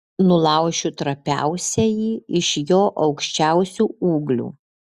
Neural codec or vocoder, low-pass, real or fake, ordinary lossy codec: none; 14.4 kHz; real; Opus, 64 kbps